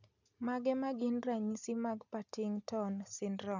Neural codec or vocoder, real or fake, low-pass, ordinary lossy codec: none; real; 7.2 kHz; none